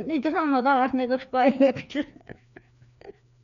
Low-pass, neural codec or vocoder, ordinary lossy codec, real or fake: 7.2 kHz; codec, 16 kHz, 2 kbps, FreqCodec, larger model; none; fake